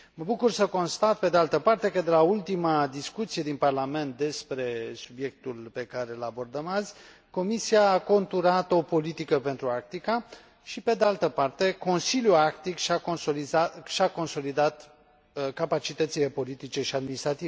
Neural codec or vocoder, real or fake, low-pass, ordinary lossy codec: none; real; none; none